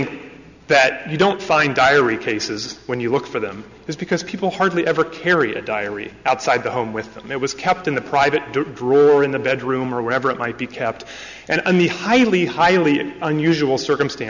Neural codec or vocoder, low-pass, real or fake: none; 7.2 kHz; real